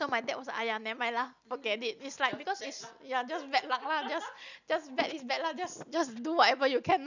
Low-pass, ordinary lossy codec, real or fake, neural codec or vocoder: 7.2 kHz; none; real; none